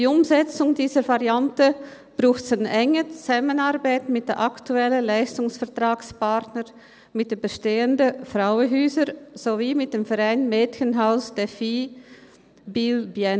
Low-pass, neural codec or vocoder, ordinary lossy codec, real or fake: none; none; none; real